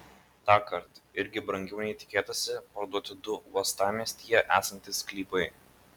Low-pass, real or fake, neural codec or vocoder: 19.8 kHz; real; none